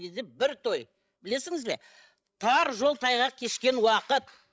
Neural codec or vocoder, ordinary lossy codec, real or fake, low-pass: codec, 16 kHz, 16 kbps, FreqCodec, larger model; none; fake; none